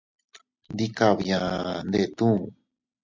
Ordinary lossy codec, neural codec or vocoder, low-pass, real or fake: MP3, 64 kbps; none; 7.2 kHz; real